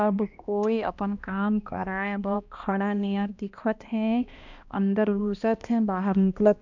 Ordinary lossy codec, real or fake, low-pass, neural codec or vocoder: none; fake; 7.2 kHz; codec, 16 kHz, 1 kbps, X-Codec, HuBERT features, trained on balanced general audio